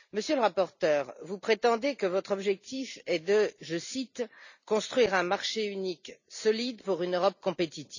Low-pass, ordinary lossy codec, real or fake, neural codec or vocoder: 7.2 kHz; none; real; none